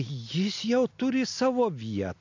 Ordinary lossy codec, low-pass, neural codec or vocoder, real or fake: MP3, 64 kbps; 7.2 kHz; none; real